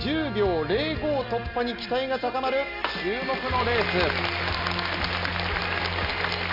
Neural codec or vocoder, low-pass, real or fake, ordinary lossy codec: none; 5.4 kHz; real; none